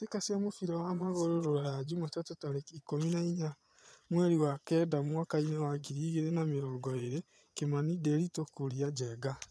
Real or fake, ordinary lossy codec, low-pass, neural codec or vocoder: fake; none; none; vocoder, 22.05 kHz, 80 mel bands, Vocos